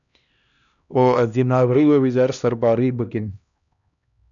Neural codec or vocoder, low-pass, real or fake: codec, 16 kHz, 1 kbps, X-Codec, HuBERT features, trained on LibriSpeech; 7.2 kHz; fake